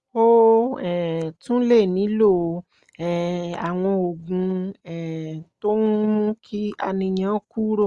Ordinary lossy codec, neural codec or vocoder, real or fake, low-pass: Opus, 32 kbps; none; real; 10.8 kHz